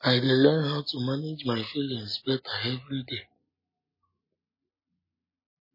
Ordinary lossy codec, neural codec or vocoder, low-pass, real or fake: MP3, 24 kbps; none; 5.4 kHz; real